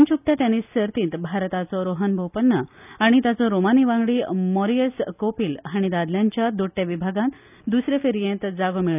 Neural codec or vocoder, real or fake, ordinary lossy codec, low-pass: none; real; none; 3.6 kHz